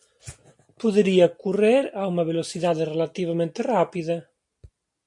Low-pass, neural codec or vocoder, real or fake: 10.8 kHz; none; real